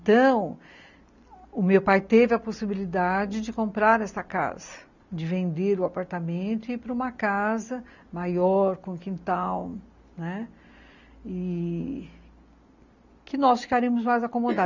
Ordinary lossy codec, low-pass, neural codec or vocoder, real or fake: none; 7.2 kHz; none; real